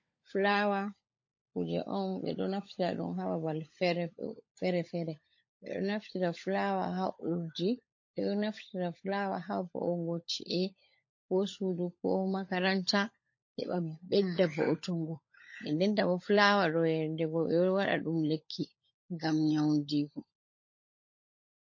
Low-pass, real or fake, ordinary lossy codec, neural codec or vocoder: 7.2 kHz; fake; MP3, 32 kbps; codec, 16 kHz, 16 kbps, FunCodec, trained on LibriTTS, 50 frames a second